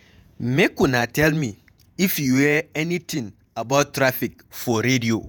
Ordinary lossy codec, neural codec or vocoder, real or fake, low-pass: none; vocoder, 48 kHz, 128 mel bands, Vocos; fake; none